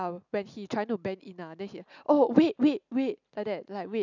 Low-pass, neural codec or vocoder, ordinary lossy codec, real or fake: 7.2 kHz; none; none; real